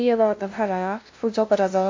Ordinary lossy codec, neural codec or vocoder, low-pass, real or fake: MP3, 48 kbps; codec, 16 kHz, 0.5 kbps, FunCodec, trained on LibriTTS, 25 frames a second; 7.2 kHz; fake